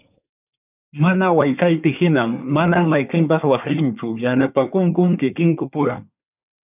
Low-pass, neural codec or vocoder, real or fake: 3.6 kHz; codec, 24 kHz, 1 kbps, SNAC; fake